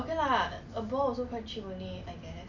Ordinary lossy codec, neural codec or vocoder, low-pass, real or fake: none; none; 7.2 kHz; real